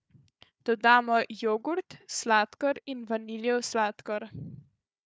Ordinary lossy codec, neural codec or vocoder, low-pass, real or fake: none; codec, 16 kHz, 4 kbps, FunCodec, trained on Chinese and English, 50 frames a second; none; fake